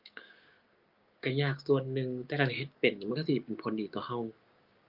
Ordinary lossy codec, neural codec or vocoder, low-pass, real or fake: Opus, 32 kbps; codec, 16 kHz, 6 kbps, DAC; 5.4 kHz; fake